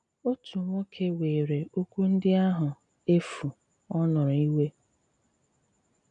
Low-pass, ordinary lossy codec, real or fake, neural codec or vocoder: 9.9 kHz; none; real; none